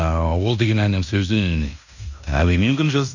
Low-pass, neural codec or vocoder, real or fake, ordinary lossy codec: 7.2 kHz; codec, 16 kHz in and 24 kHz out, 0.9 kbps, LongCat-Audio-Codec, fine tuned four codebook decoder; fake; none